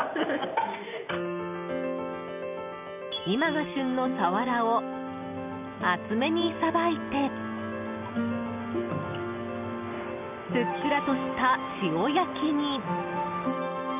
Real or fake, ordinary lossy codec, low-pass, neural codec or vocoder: real; none; 3.6 kHz; none